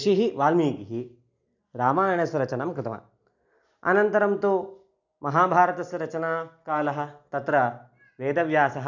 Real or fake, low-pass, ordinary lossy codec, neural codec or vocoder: real; 7.2 kHz; none; none